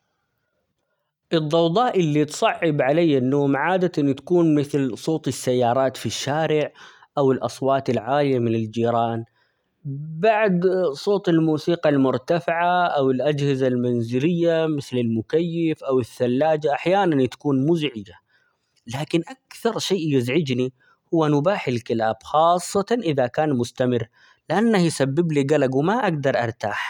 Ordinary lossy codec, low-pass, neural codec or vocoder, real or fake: none; 19.8 kHz; none; real